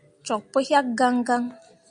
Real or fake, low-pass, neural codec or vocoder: real; 9.9 kHz; none